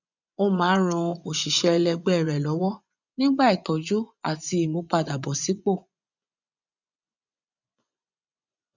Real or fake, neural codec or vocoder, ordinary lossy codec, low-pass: fake; vocoder, 24 kHz, 100 mel bands, Vocos; none; 7.2 kHz